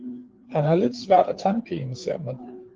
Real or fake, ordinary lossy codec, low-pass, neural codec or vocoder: fake; Opus, 16 kbps; 7.2 kHz; codec, 16 kHz, 4 kbps, FreqCodec, larger model